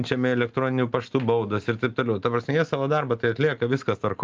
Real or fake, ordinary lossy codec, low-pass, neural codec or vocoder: real; Opus, 24 kbps; 7.2 kHz; none